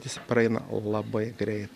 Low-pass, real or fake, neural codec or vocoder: 14.4 kHz; real; none